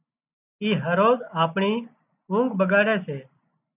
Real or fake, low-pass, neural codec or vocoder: real; 3.6 kHz; none